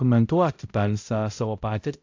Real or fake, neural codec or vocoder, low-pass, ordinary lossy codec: fake; codec, 16 kHz, 0.5 kbps, X-Codec, HuBERT features, trained on balanced general audio; 7.2 kHz; AAC, 48 kbps